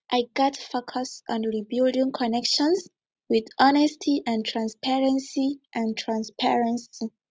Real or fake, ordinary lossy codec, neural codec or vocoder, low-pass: real; none; none; none